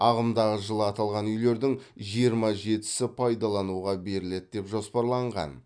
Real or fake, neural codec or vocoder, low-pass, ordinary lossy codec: real; none; 9.9 kHz; none